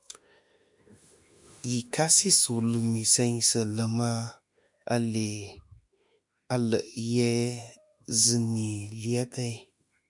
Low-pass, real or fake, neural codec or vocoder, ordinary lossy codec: 10.8 kHz; fake; autoencoder, 48 kHz, 32 numbers a frame, DAC-VAE, trained on Japanese speech; MP3, 96 kbps